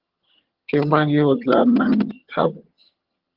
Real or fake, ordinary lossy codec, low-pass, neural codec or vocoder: fake; Opus, 16 kbps; 5.4 kHz; vocoder, 22.05 kHz, 80 mel bands, HiFi-GAN